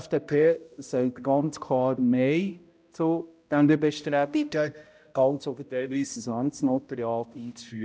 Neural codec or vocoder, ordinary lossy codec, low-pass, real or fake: codec, 16 kHz, 0.5 kbps, X-Codec, HuBERT features, trained on balanced general audio; none; none; fake